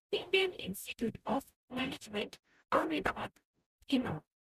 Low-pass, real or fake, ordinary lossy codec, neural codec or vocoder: 14.4 kHz; fake; none; codec, 44.1 kHz, 0.9 kbps, DAC